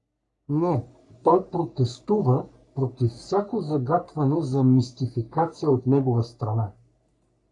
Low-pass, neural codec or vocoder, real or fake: 10.8 kHz; codec, 44.1 kHz, 3.4 kbps, Pupu-Codec; fake